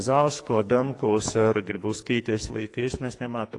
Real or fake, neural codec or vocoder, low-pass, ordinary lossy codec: fake; codec, 44.1 kHz, 2.6 kbps, SNAC; 10.8 kHz; AAC, 48 kbps